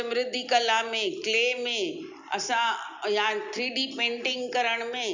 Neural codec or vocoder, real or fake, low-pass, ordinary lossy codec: none; real; 7.2 kHz; Opus, 64 kbps